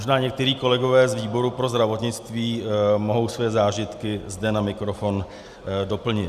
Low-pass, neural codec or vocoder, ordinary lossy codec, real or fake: 14.4 kHz; none; Opus, 64 kbps; real